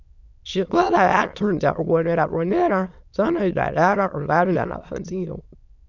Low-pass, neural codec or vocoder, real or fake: 7.2 kHz; autoencoder, 22.05 kHz, a latent of 192 numbers a frame, VITS, trained on many speakers; fake